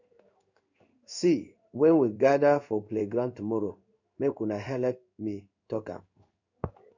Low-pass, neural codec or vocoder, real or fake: 7.2 kHz; codec, 16 kHz in and 24 kHz out, 1 kbps, XY-Tokenizer; fake